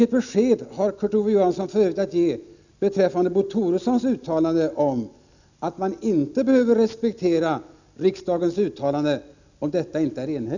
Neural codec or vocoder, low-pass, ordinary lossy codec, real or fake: none; 7.2 kHz; none; real